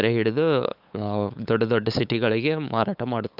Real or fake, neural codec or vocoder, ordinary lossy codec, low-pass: fake; codec, 16 kHz, 8 kbps, FunCodec, trained on LibriTTS, 25 frames a second; Opus, 64 kbps; 5.4 kHz